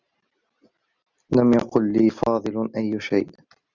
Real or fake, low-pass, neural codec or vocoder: real; 7.2 kHz; none